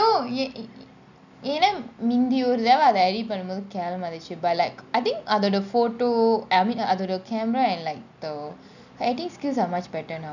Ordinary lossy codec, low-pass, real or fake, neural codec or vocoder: none; 7.2 kHz; real; none